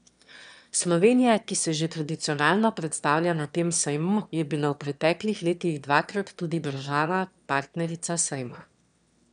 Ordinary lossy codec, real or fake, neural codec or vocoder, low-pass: none; fake; autoencoder, 22.05 kHz, a latent of 192 numbers a frame, VITS, trained on one speaker; 9.9 kHz